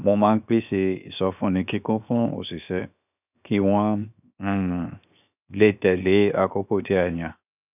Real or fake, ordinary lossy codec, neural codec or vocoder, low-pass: fake; none; codec, 16 kHz, 0.7 kbps, FocalCodec; 3.6 kHz